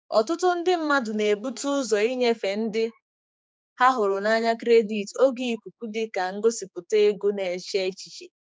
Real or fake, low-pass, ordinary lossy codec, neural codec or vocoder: fake; none; none; codec, 16 kHz, 4 kbps, X-Codec, HuBERT features, trained on general audio